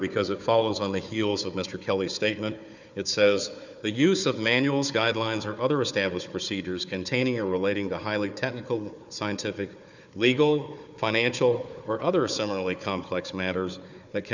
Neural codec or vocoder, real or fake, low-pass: codec, 16 kHz, 4 kbps, FunCodec, trained on Chinese and English, 50 frames a second; fake; 7.2 kHz